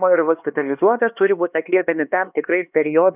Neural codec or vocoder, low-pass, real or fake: codec, 16 kHz, 2 kbps, X-Codec, HuBERT features, trained on LibriSpeech; 3.6 kHz; fake